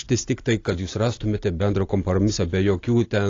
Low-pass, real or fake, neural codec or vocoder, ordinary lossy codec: 7.2 kHz; real; none; AAC, 32 kbps